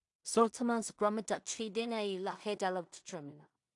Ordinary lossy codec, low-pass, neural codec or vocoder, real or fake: AAC, 64 kbps; 10.8 kHz; codec, 16 kHz in and 24 kHz out, 0.4 kbps, LongCat-Audio-Codec, two codebook decoder; fake